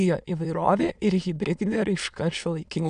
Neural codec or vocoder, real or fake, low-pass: autoencoder, 22.05 kHz, a latent of 192 numbers a frame, VITS, trained on many speakers; fake; 9.9 kHz